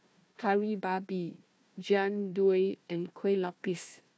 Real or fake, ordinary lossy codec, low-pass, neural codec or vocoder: fake; none; none; codec, 16 kHz, 1 kbps, FunCodec, trained on Chinese and English, 50 frames a second